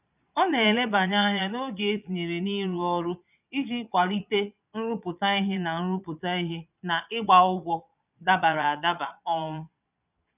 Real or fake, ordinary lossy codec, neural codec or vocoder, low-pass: fake; none; vocoder, 22.05 kHz, 80 mel bands, Vocos; 3.6 kHz